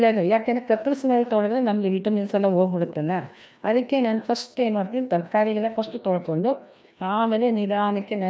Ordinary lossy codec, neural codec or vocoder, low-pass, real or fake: none; codec, 16 kHz, 1 kbps, FreqCodec, larger model; none; fake